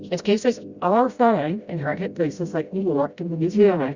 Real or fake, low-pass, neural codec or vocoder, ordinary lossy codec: fake; 7.2 kHz; codec, 16 kHz, 0.5 kbps, FreqCodec, smaller model; Opus, 64 kbps